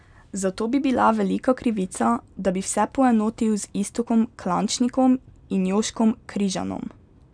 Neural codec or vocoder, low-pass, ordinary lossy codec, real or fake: none; 9.9 kHz; none; real